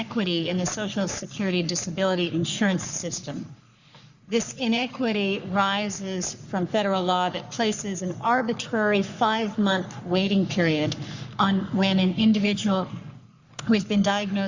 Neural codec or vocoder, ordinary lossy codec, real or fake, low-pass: codec, 44.1 kHz, 3.4 kbps, Pupu-Codec; Opus, 64 kbps; fake; 7.2 kHz